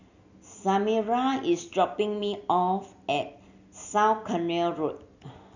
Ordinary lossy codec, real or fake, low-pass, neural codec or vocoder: none; real; 7.2 kHz; none